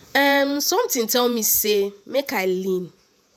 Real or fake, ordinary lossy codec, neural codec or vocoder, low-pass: fake; none; vocoder, 48 kHz, 128 mel bands, Vocos; none